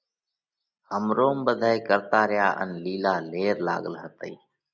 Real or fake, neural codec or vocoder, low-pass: real; none; 7.2 kHz